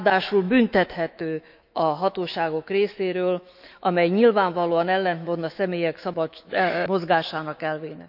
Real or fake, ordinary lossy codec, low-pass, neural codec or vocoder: fake; none; 5.4 kHz; autoencoder, 48 kHz, 128 numbers a frame, DAC-VAE, trained on Japanese speech